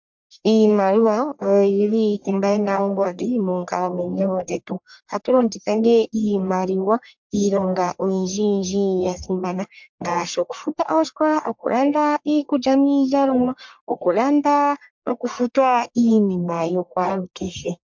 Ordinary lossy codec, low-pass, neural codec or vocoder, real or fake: MP3, 64 kbps; 7.2 kHz; codec, 44.1 kHz, 1.7 kbps, Pupu-Codec; fake